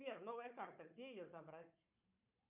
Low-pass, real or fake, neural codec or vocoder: 3.6 kHz; fake; codec, 16 kHz, 4 kbps, FunCodec, trained on Chinese and English, 50 frames a second